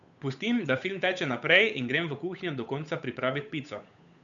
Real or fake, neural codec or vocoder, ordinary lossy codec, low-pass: fake; codec, 16 kHz, 8 kbps, FunCodec, trained on Chinese and English, 25 frames a second; none; 7.2 kHz